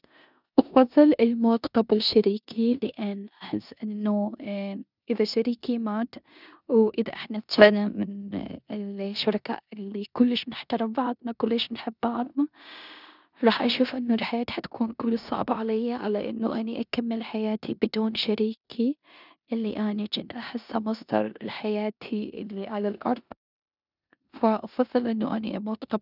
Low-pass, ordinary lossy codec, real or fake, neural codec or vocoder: 5.4 kHz; none; fake; codec, 16 kHz in and 24 kHz out, 0.9 kbps, LongCat-Audio-Codec, four codebook decoder